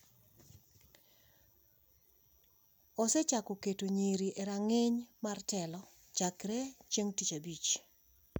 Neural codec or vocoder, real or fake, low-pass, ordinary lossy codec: none; real; none; none